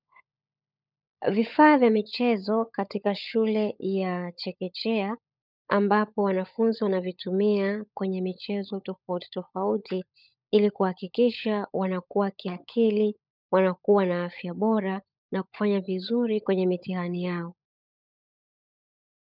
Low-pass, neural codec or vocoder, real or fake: 5.4 kHz; codec, 16 kHz, 16 kbps, FunCodec, trained on LibriTTS, 50 frames a second; fake